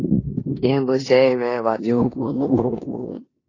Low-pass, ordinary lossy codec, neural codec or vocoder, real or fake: 7.2 kHz; AAC, 32 kbps; codec, 16 kHz in and 24 kHz out, 0.9 kbps, LongCat-Audio-Codec, four codebook decoder; fake